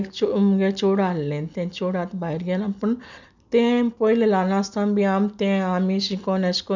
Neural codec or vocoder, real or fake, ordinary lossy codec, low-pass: none; real; none; 7.2 kHz